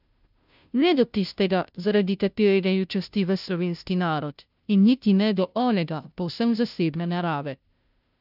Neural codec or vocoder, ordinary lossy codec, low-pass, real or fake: codec, 16 kHz, 0.5 kbps, FunCodec, trained on Chinese and English, 25 frames a second; none; 5.4 kHz; fake